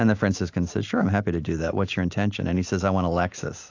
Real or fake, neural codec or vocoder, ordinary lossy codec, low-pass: real; none; AAC, 48 kbps; 7.2 kHz